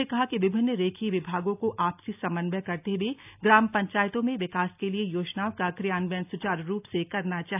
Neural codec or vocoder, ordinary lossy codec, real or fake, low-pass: none; AAC, 32 kbps; real; 3.6 kHz